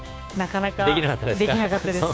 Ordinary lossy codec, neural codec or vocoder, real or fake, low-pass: none; codec, 16 kHz, 6 kbps, DAC; fake; none